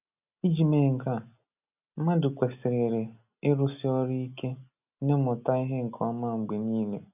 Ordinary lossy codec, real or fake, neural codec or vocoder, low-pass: none; real; none; 3.6 kHz